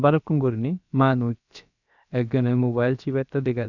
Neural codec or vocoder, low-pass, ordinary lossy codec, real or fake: codec, 16 kHz, about 1 kbps, DyCAST, with the encoder's durations; 7.2 kHz; none; fake